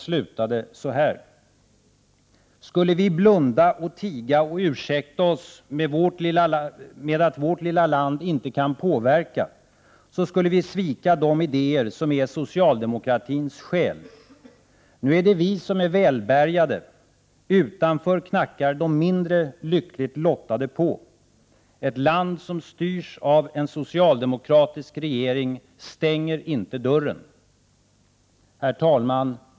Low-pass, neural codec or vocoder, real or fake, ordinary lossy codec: none; none; real; none